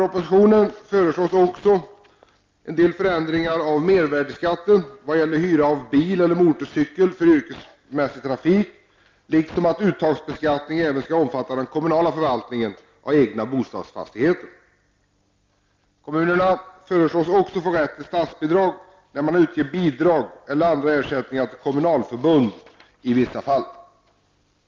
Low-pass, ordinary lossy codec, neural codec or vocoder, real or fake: 7.2 kHz; Opus, 32 kbps; none; real